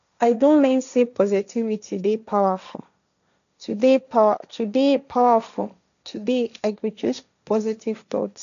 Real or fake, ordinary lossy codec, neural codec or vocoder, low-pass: fake; none; codec, 16 kHz, 1.1 kbps, Voila-Tokenizer; 7.2 kHz